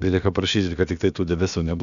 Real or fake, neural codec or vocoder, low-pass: fake; codec, 16 kHz, about 1 kbps, DyCAST, with the encoder's durations; 7.2 kHz